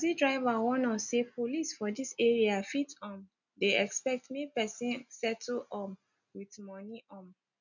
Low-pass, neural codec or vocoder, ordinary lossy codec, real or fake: 7.2 kHz; none; none; real